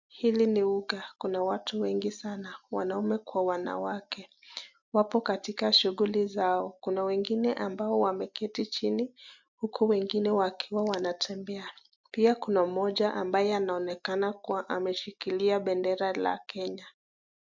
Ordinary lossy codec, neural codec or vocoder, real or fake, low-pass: MP3, 64 kbps; none; real; 7.2 kHz